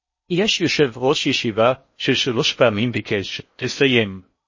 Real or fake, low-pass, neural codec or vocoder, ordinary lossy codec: fake; 7.2 kHz; codec, 16 kHz in and 24 kHz out, 0.6 kbps, FocalCodec, streaming, 4096 codes; MP3, 32 kbps